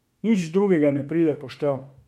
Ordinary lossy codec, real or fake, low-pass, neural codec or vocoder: MP3, 64 kbps; fake; 19.8 kHz; autoencoder, 48 kHz, 32 numbers a frame, DAC-VAE, trained on Japanese speech